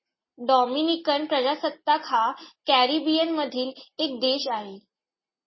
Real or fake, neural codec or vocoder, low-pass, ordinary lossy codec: real; none; 7.2 kHz; MP3, 24 kbps